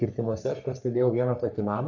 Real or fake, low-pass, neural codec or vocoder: fake; 7.2 kHz; codec, 44.1 kHz, 3.4 kbps, Pupu-Codec